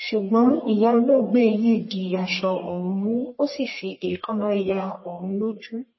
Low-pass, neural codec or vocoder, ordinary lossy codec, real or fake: 7.2 kHz; codec, 44.1 kHz, 1.7 kbps, Pupu-Codec; MP3, 24 kbps; fake